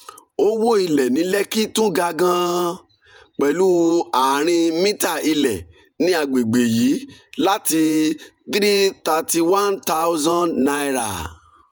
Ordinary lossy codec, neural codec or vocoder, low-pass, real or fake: none; vocoder, 48 kHz, 128 mel bands, Vocos; none; fake